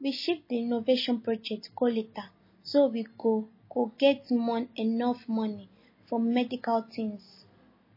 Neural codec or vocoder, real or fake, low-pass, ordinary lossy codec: none; real; 5.4 kHz; MP3, 24 kbps